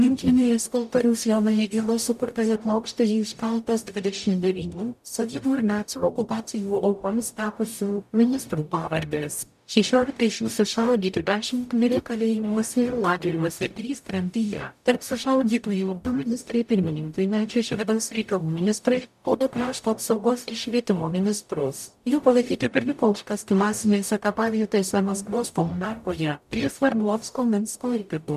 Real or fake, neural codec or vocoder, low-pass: fake; codec, 44.1 kHz, 0.9 kbps, DAC; 14.4 kHz